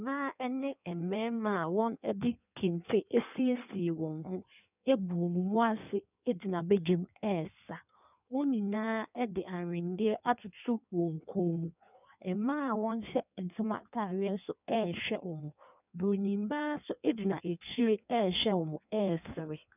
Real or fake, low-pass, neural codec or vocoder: fake; 3.6 kHz; codec, 16 kHz in and 24 kHz out, 1.1 kbps, FireRedTTS-2 codec